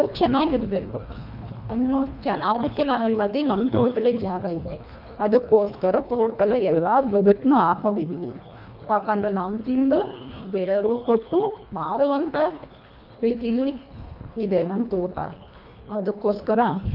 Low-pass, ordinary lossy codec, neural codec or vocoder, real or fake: 5.4 kHz; none; codec, 24 kHz, 1.5 kbps, HILCodec; fake